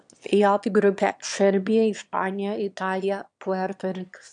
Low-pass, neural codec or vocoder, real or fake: 9.9 kHz; autoencoder, 22.05 kHz, a latent of 192 numbers a frame, VITS, trained on one speaker; fake